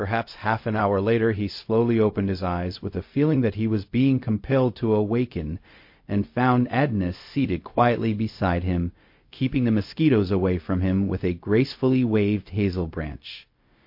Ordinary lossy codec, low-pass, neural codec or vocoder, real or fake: MP3, 32 kbps; 5.4 kHz; codec, 16 kHz, 0.4 kbps, LongCat-Audio-Codec; fake